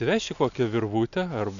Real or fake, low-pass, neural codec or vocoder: real; 7.2 kHz; none